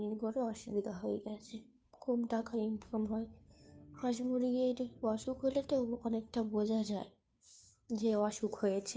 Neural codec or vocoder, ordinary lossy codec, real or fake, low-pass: codec, 16 kHz, 2 kbps, FunCodec, trained on Chinese and English, 25 frames a second; none; fake; none